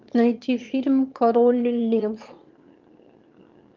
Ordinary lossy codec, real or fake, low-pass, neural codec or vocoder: Opus, 24 kbps; fake; 7.2 kHz; autoencoder, 22.05 kHz, a latent of 192 numbers a frame, VITS, trained on one speaker